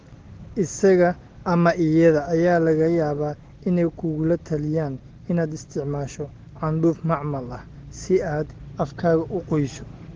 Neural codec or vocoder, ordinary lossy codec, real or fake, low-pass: none; Opus, 16 kbps; real; 7.2 kHz